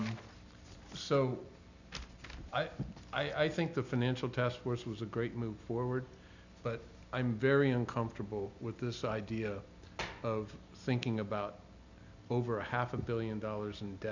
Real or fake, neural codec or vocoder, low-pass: real; none; 7.2 kHz